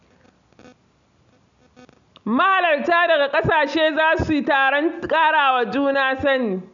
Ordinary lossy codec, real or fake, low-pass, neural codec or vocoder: none; real; 7.2 kHz; none